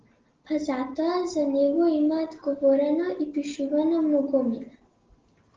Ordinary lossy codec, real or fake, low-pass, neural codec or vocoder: Opus, 16 kbps; real; 7.2 kHz; none